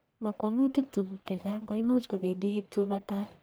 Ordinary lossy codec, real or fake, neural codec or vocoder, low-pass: none; fake; codec, 44.1 kHz, 1.7 kbps, Pupu-Codec; none